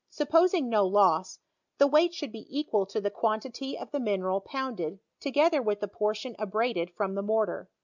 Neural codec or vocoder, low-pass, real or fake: none; 7.2 kHz; real